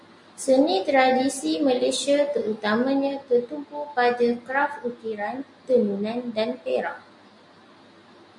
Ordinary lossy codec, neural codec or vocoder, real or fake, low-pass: MP3, 64 kbps; none; real; 10.8 kHz